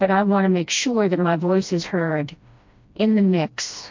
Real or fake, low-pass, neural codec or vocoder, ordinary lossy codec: fake; 7.2 kHz; codec, 16 kHz, 1 kbps, FreqCodec, smaller model; MP3, 48 kbps